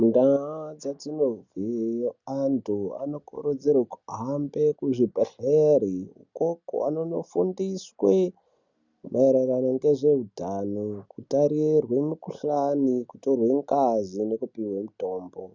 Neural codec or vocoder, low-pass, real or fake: none; 7.2 kHz; real